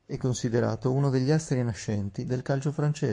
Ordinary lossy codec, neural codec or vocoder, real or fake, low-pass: MP3, 48 kbps; codec, 44.1 kHz, 7.8 kbps, Pupu-Codec; fake; 10.8 kHz